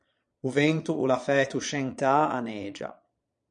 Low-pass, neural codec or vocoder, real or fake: 9.9 kHz; vocoder, 22.05 kHz, 80 mel bands, Vocos; fake